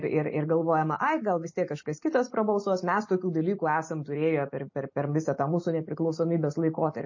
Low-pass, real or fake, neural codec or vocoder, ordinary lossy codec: 7.2 kHz; real; none; MP3, 32 kbps